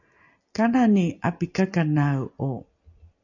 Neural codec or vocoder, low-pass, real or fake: none; 7.2 kHz; real